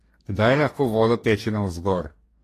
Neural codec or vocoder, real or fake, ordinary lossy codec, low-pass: codec, 44.1 kHz, 2.6 kbps, DAC; fake; AAC, 48 kbps; 14.4 kHz